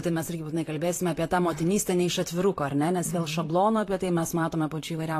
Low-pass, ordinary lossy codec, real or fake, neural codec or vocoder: 14.4 kHz; AAC, 48 kbps; fake; vocoder, 44.1 kHz, 128 mel bands, Pupu-Vocoder